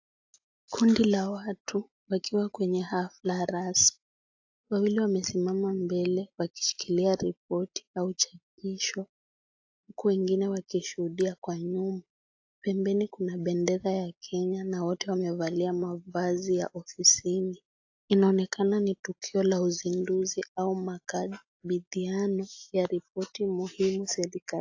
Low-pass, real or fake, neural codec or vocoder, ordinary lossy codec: 7.2 kHz; real; none; AAC, 48 kbps